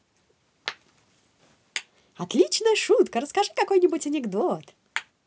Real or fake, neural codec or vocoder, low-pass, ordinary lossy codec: real; none; none; none